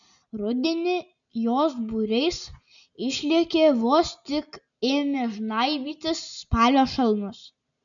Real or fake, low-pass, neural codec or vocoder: real; 7.2 kHz; none